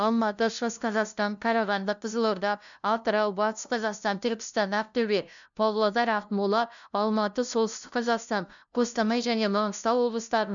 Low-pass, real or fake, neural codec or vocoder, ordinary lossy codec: 7.2 kHz; fake; codec, 16 kHz, 0.5 kbps, FunCodec, trained on LibriTTS, 25 frames a second; none